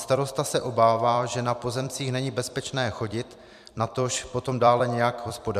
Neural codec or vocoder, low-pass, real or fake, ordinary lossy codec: vocoder, 44.1 kHz, 128 mel bands every 256 samples, BigVGAN v2; 14.4 kHz; fake; MP3, 96 kbps